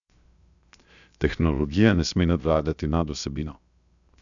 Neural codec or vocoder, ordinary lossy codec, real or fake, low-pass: codec, 16 kHz, 0.7 kbps, FocalCodec; none; fake; 7.2 kHz